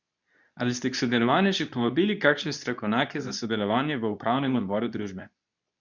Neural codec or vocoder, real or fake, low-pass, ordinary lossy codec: codec, 24 kHz, 0.9 kbps, WavTokenizer, medium speech release version 2; fake; 7.2 kHz; none